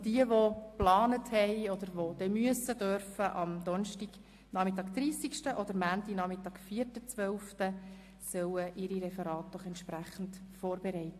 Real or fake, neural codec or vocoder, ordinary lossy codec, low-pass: real; none; AAC, 64 kbps; 14.4 kHz